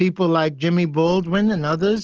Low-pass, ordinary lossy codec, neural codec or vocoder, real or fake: 7.2 kHz; Opus, 16 kbps; none; real